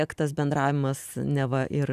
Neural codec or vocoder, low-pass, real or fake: none; 14.4 kHz; real